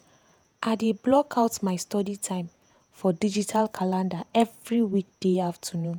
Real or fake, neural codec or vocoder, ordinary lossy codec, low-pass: fake; vocoder, 48 kHz, 128 mel bands, Vocos; none; none